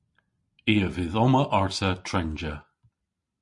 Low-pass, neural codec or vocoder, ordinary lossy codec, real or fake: 10.8 kHz; none; MP3, 48 kbps; real